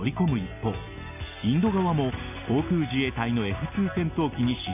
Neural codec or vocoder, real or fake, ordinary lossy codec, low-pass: none; real; none; 3.6 kHz